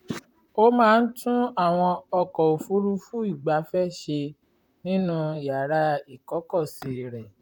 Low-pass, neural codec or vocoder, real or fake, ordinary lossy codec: 19.8 kHz; vocoder, 44.1 kHz, 128 mel bands, Pupu-Vocoder; fake; none